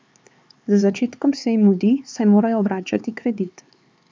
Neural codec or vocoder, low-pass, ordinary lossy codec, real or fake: codec, 16 kHz, 4 kbps, X-Codec, HuBERT features, trained on LibriSpeech; none; none; fake